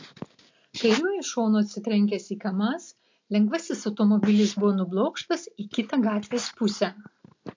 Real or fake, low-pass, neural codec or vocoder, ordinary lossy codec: real; 7.2 kHz; none; MP3, 48 kbps